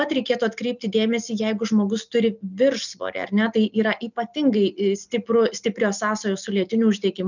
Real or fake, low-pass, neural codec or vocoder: real; 7.2 kHz; none